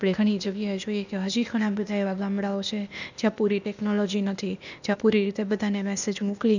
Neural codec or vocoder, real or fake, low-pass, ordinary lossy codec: codec, 16 kHz, 0.8 kbps, ZipCodec; fake; 7.2 kHz; none